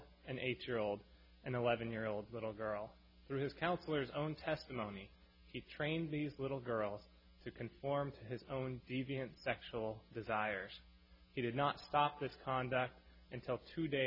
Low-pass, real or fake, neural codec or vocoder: 5.4 kHz; real; none